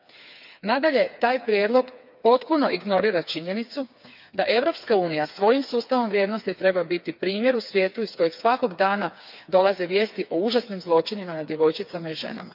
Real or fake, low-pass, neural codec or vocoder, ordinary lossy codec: fake; 5.4 kHz; codec, 16 kHz, 4 kbps, FreqCodec, smaller model; none